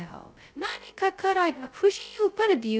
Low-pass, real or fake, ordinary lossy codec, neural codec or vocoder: none; fake; none; codec, 16 kHz, 0.2 kbps, FocalCodec